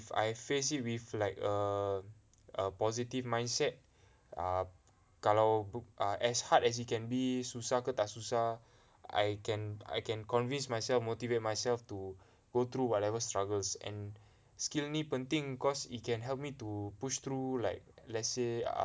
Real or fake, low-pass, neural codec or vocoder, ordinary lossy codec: real; none; none; none